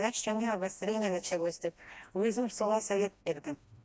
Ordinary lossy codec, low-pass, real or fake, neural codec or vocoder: none; none; fake; codec, 16 kHz, 1 kbps, FreqCodec, smaller model